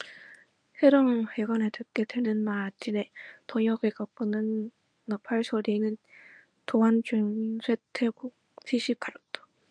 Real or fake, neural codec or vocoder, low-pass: fake; codec, 24 kHz, 0.9 kbps, WavTokenizer, medium speech release version 1; 9.9 kHz